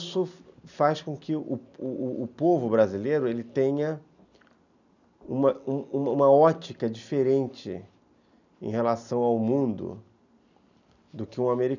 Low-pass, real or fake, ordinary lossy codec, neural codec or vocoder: 7.2 kHz; real; none; none